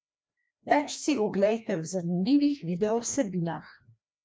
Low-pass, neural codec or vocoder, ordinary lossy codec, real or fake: none; codec, 16 kHz, 1 kbps, FreqCodec, larger model; none; fake